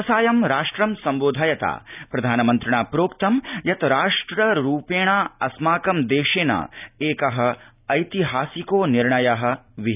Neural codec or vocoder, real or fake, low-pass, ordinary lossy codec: none; real; 3.6 kHz; none